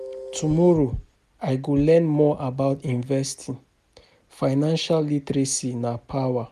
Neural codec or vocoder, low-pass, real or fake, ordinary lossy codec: none; 14.4 kHz; real; none